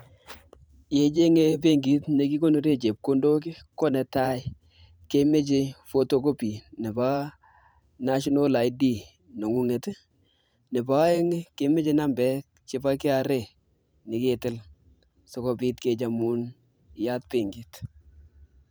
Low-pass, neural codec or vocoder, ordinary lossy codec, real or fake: none; vocoder, 44.1 kHz, 128 mel bands every 512 samples, BigVGAN v2; none; fake